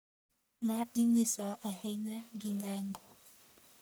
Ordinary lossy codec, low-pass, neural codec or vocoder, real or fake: none; none; codec, 44.1 kHz, 1.7 kbps, Pupu-Codec; fake